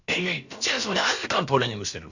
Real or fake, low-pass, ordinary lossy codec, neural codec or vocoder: fake; 7.2 kHz; Opus, 64 kbps; codec, 16 kHz, about 1 kbps, DyCAST, with the encoder's durations